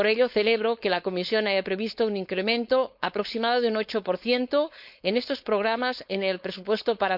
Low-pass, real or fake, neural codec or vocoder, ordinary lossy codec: 5.4 kHz; fake; codec, 16 kHz, 4.8 kbps, FACodec; none